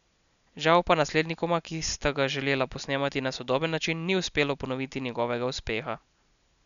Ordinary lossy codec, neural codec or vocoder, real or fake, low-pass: none; none; real; 7.2 kHz